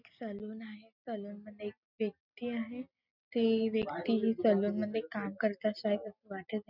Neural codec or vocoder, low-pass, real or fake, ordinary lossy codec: none; 5.4 kHz; real; none